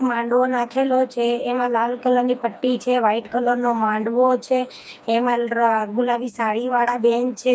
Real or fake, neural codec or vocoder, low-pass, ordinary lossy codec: fake; codec, 16 kHz, 2 kbps, FreqCodec, smaller model; none; none